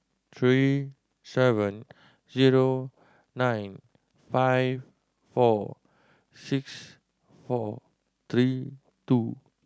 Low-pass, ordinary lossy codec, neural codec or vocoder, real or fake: none; none; none; real